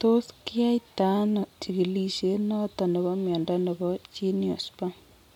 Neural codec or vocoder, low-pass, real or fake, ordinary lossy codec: none; 19.8 kHz; real; MP3, 96 kbps